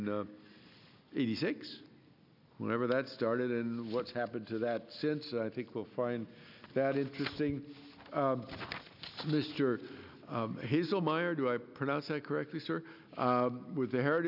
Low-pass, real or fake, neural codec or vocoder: 5.4 kHz; real; none